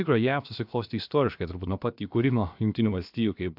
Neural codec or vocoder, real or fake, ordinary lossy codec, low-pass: codec, 16 kHz, about 1 kbps, DyCAST, with the encoder's durations; fake; AAC, 48 kbps; 5.4 kHz